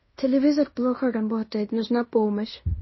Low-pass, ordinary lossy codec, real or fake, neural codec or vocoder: 7.2 kHz; MP3, 24 kbps; fake; codec, 16 kHz in and 24 kHz out, 0.9 kbps, LongCat-Audio-Codec, fine tuned four codebook decoder